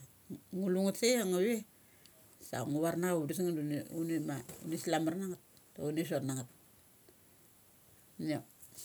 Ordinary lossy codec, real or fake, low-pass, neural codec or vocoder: none; real; none; none